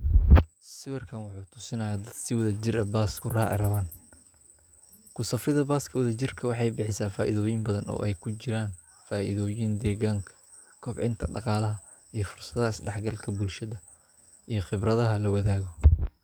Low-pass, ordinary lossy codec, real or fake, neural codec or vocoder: none; none; fake; codec, 44.1 kHz, 7.8 kbps, DAC